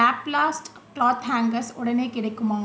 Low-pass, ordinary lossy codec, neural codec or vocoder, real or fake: none; none; none; real